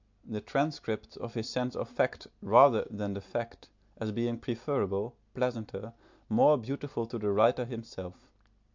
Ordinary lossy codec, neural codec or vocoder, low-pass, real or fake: MP3, 64 kbps; none; 7.2 kHz; real